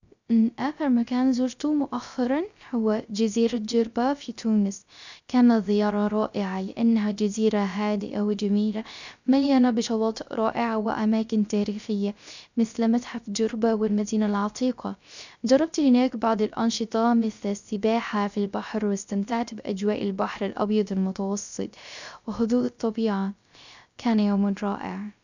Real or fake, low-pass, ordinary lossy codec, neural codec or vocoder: fake; 7.2 kHz; none; codec, 16 kHz, 0.3 kbps, FocalCodec